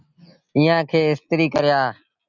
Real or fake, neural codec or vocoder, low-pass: real; none; 7.2 kHz